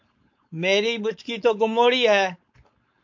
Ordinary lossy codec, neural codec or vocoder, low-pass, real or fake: MP3, 48 kbps; codec, 16 kHz, 4.8 kbps, FACodec; 7.2 kHz; fake